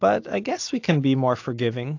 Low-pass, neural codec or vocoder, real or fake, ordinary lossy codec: 7.2 kHz; none; real; AAC, 48 kbps